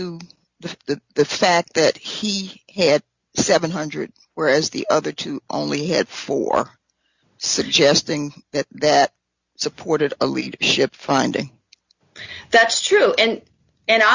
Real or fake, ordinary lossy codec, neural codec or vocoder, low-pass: real; Opus, 64 kbps; none; 7.2 kHz